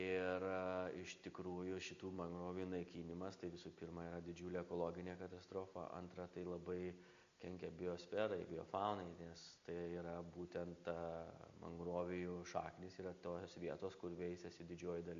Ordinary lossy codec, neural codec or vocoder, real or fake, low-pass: AAC, 64 kbps; none; real; 7.2 kHz